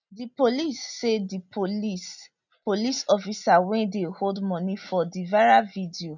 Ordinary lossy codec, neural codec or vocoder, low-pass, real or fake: none; none; 7.2 kHz; real